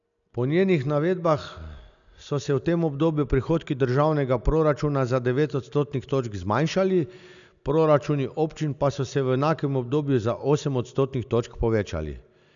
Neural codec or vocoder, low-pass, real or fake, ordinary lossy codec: none; 7.2 kHz; real; none